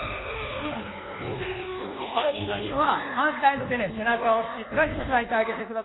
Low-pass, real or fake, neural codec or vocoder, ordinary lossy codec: 7.2 kHz; fake; codec, 24 kHz, 1.2 kbps, DualCodec; AAC, 16 kbps